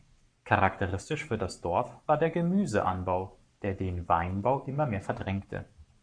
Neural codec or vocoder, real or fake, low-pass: codec, 44.1 kHz, 7.8 kbps, Pupu-Codec; fake; 9.9 kHz